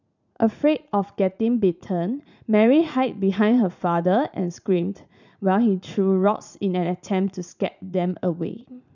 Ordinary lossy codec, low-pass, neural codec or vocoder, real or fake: none; 7.2 kHz; vocoder, 44.1 kHz, 80 mel bands, Vocos; fake